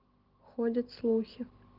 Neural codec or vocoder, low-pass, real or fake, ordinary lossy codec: none; 5.4 kHz; real; Opus, 16 kbps